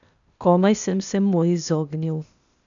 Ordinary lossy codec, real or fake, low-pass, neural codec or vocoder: none; fake; 7.2 kHz; codec, 16 kHz, 0.8 kbps, ZipCodec